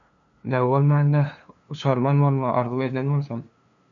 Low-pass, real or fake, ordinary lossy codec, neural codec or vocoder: 7.2 kHz; fake; MP3, 96 kbps; codec, 16 kHz, 2 kbps, FunCodec, trained on LibriTTS, 25 frames a second